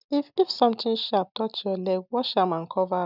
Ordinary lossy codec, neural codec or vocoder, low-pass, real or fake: none; none; 5.4 kHz; real